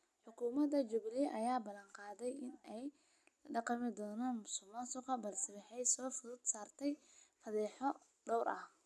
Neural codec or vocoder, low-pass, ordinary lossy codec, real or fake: none; none; none; real